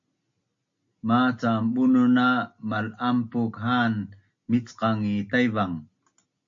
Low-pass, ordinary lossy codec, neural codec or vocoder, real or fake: 7.2 kHz; AAC, 64 kbps; none; real